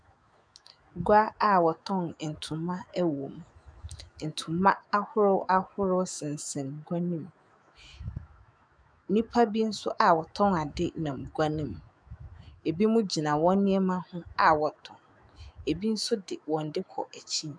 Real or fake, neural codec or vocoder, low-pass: fake; autoencoder, 48 kHz, 128 numbers a frame, DAC-VAE, trained on Japanese speech; 9.9 kHz